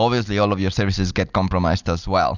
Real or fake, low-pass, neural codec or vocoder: real; 7.2 kHz; none